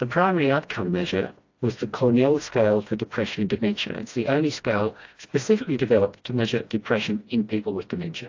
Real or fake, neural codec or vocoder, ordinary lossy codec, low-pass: fake; codec, 16 kHz, 1 kbps, FreqCodec, smaller model; AAC, 48 kbps; 7.2 kHz